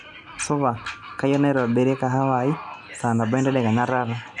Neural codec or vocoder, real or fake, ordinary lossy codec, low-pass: none; real; none; 10.8 kHz